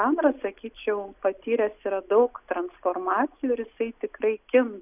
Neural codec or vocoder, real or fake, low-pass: none; real; 3.6 kHz